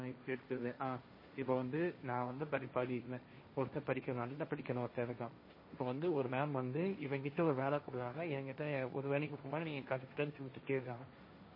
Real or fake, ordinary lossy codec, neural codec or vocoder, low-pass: fake; MP3, 24 kbps; codec, 16 kHz, 1.1 kbps, Voila-Tokenizer; 5.4 kHz